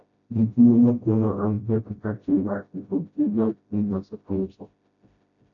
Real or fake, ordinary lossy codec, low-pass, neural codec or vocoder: fake; AAC, 48 kbps; 7.2 kHz; codec, 16 kHz, 0.5 kbps, FreqCodec, smaller model